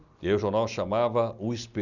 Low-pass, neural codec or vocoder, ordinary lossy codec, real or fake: 7.2 kHz; none; none; real